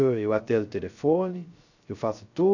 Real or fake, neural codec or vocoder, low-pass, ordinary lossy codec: fake; codec, 16 kHz, 0.3 kbps, FocalCodec; 7.2 kHz; none